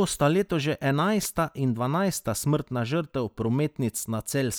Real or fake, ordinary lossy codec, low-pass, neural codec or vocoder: real; none; none; none